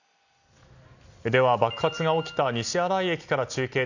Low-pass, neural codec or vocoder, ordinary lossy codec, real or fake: 7.2 kHz; none; none; real